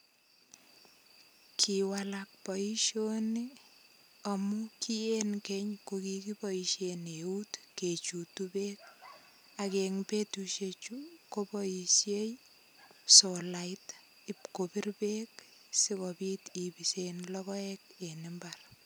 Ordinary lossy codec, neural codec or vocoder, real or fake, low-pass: none; none; real; none